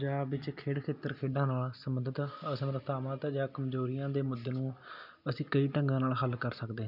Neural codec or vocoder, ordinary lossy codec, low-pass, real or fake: none; none; 5.4 kHz; real